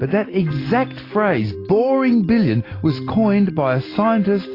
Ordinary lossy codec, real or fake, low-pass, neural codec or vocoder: AAC, 24 kbps; real; 5.4 kHz; none